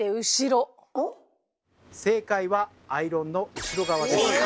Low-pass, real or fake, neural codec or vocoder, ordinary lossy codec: none; real; none; none